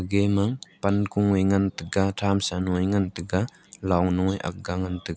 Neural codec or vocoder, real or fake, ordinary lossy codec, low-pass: none; real; none; none